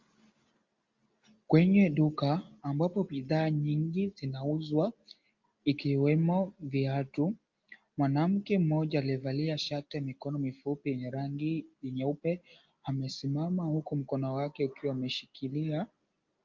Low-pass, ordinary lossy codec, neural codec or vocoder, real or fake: 7.2 kHz; Opus, 32 kbps; none; real